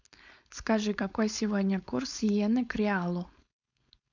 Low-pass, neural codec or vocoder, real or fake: 7.2 kHz; codec, 16 kHz, 4.8 kbps, FACodec; fake